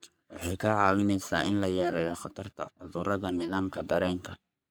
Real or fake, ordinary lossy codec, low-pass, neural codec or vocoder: fake; none; none; codec, 44.1 kHz, 3.4 kbps, Pupu-Codec